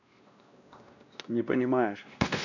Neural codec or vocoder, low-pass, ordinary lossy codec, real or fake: codec, 16 kHz, 1 kbps, X-Codec, WavLM features, trained on Multilingual LibriSpeech; 7.2 kHz; none; fake